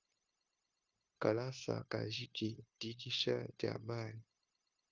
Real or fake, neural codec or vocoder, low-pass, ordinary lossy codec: fake; codec, 16 kHz, 0.9 kbps, LongCat-Audio-Codec; 7.2 kHz; Opus, 32 kbps